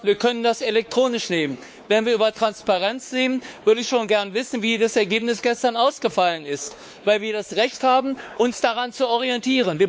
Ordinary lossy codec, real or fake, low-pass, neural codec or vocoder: none; fake; none; codec, 16 kHz, 4 kbps, X-Codec, WavLM features, trained on Multilingual LibriSpeech